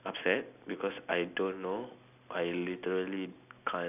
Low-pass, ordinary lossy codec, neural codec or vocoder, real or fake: 3.6 kHz; none; none; real